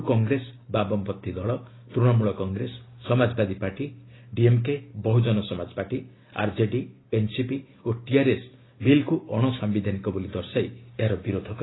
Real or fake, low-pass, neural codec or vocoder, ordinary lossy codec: real; 7.2 kHz; none; AAC, 16 kbps